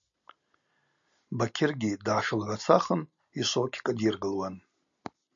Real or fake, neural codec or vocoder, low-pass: real; none; 7.2 kHz